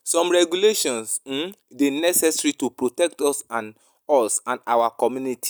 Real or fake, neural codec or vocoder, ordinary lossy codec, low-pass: real; none; none; none